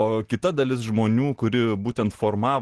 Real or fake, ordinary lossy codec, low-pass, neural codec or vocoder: real; Opus, 16 kbps; 10.8 kHz; none